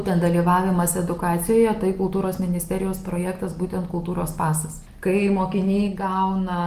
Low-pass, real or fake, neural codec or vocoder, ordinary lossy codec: 14.4 kHz; real; none; Opus, 24 kbps